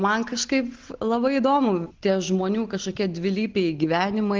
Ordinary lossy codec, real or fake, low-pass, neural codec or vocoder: Opus, 16 kbps; real; 7.2 kHz; none